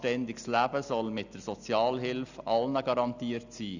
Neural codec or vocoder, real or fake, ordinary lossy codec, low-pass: none; real; none; 7.2 kHz